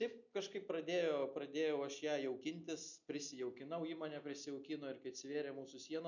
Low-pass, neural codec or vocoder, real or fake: 7.2 kHz; none; real